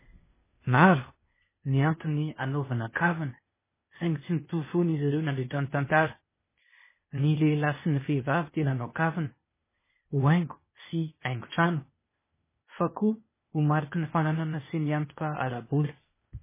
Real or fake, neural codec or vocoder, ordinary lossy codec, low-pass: fake; codec, 16 kHz, 0.8 kbps, ZipCodec; MP3, 16 kbps; 3.6 kHz